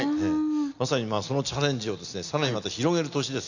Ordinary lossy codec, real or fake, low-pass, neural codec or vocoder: none; real; 7.2 kHz; none